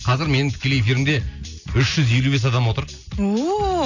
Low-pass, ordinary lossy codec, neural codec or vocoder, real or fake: 7.2 kHz; none; none; real